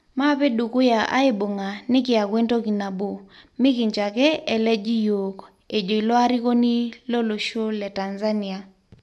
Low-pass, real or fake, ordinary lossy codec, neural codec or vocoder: none; real; none; none